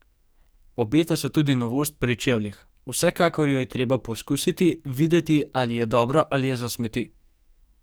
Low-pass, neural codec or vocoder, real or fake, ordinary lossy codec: none; codec, 44.1 kHz, 2.6 kbps, SNAC; fake; none